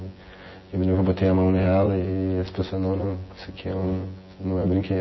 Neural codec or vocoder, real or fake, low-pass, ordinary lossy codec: vocoder, 24 kHz, 100 mel bands, Vocos; fake; 7.2 kHz; MP3, 24 kbps